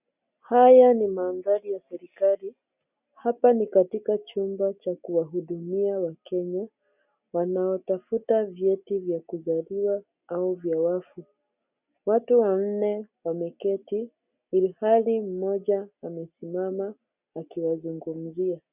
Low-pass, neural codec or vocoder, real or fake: 3.6 kHz; none; real